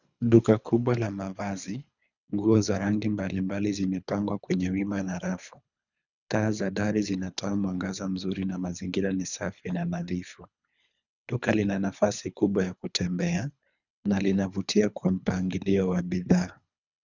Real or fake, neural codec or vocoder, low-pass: fake; codec, 24 kHz, 3 kbps, HILCodec; 7.2 kHz